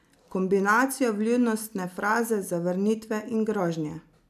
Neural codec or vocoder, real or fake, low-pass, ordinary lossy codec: none; real; 14.4 kHz; none